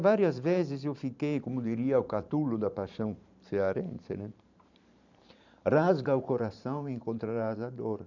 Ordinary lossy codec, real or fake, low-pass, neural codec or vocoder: none; fake; 7.2 kHz; vocoder, 44.1 kHz, 128 mel bands every 512 samples, BigVGAN v2